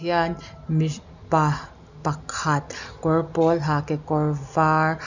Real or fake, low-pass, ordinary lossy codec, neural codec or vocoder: real; 7.2 kHz; none; none